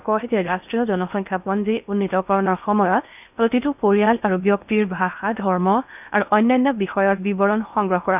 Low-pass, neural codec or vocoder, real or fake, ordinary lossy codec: 3.6 kHz; codec, 16 kHz in and 24 kHz out, 0.8 kbps, FocalCodec, streaming, 65536 codes; fake; none